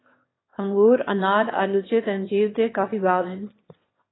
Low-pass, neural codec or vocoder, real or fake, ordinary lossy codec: 7.2 kHz; autoencoder, 22.05 kHz, a latent of 192 numbers a frame, VITS, trained on one speaker; fake; AAC, 16 kbps